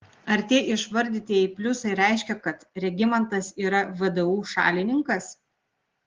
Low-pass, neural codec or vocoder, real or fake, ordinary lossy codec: 7.2 kHz; none; real; Opus, 16 kbps